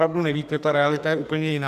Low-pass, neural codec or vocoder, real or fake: 14.4 kHz; codec, 44.1 kHz, 2.6 kbps, SNAC; fake